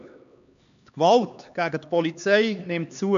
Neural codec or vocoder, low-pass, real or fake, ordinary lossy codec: codec, 16 kHz, 2 kbps, X-Codec, HuBERT features, trained on LibriSpeech; 7.2 kHz; fake; none